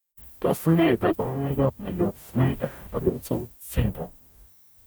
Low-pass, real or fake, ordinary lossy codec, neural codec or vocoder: none; fake; none; codec, 44.1 kHz, 0.9 kbps, DAC